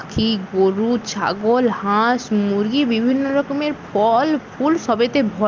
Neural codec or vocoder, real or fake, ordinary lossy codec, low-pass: none; real; Opus, 32 kbps; 7.2 kHz